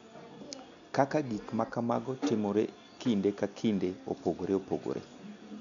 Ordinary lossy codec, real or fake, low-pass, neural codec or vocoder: none; real; 7.2 kHz; none